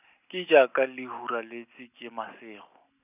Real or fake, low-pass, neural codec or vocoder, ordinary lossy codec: real; 3.6 kHz; none; none